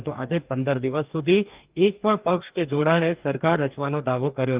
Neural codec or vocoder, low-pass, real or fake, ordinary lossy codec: codec, 44.1 kHz, 2.6 kbps, DAC; 3.6 kHz; fake; Opus, 24 kbps